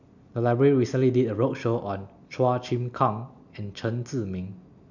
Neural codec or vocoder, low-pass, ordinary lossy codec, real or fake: none; 7.2 kHz; none; real